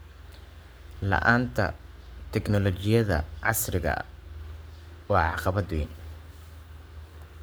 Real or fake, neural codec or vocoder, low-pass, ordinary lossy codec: fake; codec, 44.1 kHz, 7.8 kbps, Pupu-Codec; none; none